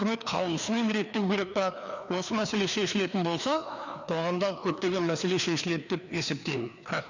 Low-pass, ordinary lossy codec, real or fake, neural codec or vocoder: 7.2 kHz; none; fake; codec, 16 kHz, 2 kbps, FreqCodec, larger model